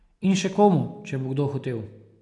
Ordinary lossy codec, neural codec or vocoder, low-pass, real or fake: none; none; 10.8 kHz; real